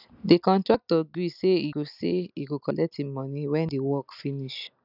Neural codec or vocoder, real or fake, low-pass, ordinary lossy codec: none; real; 5.4 kHz; none